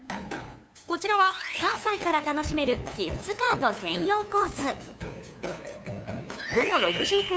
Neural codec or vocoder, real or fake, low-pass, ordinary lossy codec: codec, 16 kHz, 2 kbps, FunCodec, trained on LibriTTS, 25 frames a second; fake; none; none